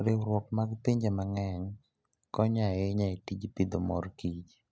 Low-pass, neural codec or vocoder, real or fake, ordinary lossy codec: none; none; real; none